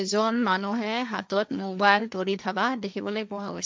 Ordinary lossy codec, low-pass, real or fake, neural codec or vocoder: none; none; fake; codec, 16 kHz, 1.1 kbps, Voila-Tokenizer